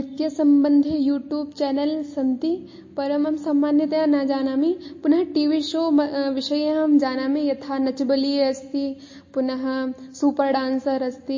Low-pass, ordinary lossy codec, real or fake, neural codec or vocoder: 7.2 kHz; MP3, 32 kbps; real; none